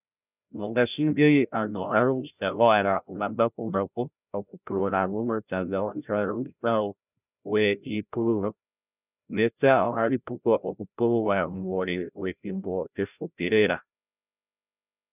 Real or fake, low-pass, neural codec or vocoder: fake; 3.6 kHz; codec, 16 kHz, 0.5 kbps, FreqCodec, larger model